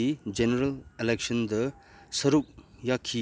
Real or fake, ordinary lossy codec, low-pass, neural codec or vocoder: real; none; none; none